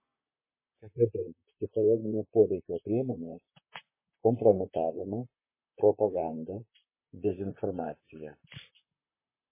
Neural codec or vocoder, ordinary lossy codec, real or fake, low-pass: vocoder, 44.1 kHz, 128 mel bands, Pupu-Vocoder; MP3, 16 kbps; fake; 3.6 kHz